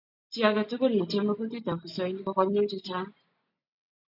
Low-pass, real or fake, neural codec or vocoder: 5.4 kHz; real; none